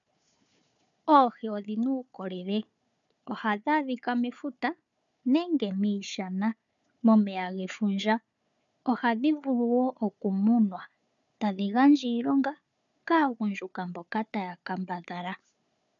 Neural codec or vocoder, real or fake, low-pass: codec, 16 kHz, 4 kbps, FunCodec, trained on Chinese and English, 50 frames a second; fake; 7.2 kHz